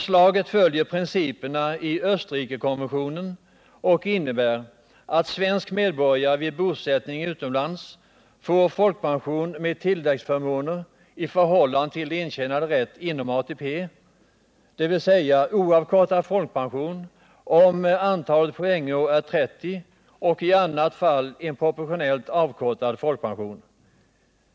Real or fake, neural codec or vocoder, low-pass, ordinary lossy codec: real; none; none; none